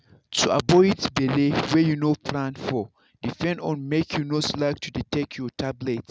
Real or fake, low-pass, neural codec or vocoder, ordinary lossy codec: real; none; none; none